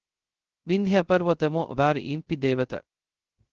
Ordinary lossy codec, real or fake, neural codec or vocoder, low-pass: Opus, 16 kbps; fake; codec, 16 kHz, 0.2 kbps, FocalCodec; 7.2 kHz